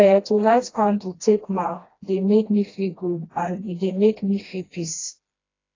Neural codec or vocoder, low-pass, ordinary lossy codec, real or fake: codec, 16 kHz, 1 kbps, FreqCodec, smaller model; 7.2 kHz; AAC, 32 kbps; fake